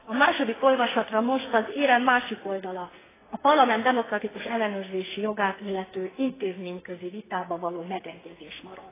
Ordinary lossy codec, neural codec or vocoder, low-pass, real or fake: AAC, 16 kbps; codec, 44.1 kHz, 2.6 kbps, SNAC; 3.6 kHz; fake